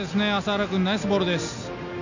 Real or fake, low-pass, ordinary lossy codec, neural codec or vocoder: real; 7.2 kHz; none; none